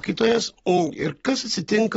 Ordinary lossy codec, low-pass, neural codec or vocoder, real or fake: AAC, 24 kbps; 19.8 kHz; vocoder, 44.1 kHz, 128 mel bands every 256 samples, BigVGAN v2; fake